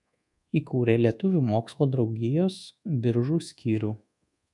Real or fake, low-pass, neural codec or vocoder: fake; 10.8 kHz; codec, 24 kHz, 1.2 kbps, DualCodec